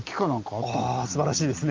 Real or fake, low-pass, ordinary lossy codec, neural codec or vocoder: real; 7.2 kHz; Opus, 24 kbps; none